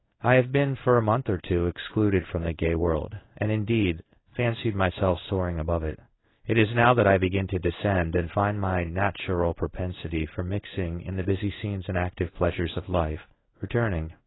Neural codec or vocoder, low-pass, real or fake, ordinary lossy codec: codec, 16 kHz in and 24 kHz out, 1 kbps, XY-Tokenizer; 7.2 kHz; fake; AAC, 16 kbps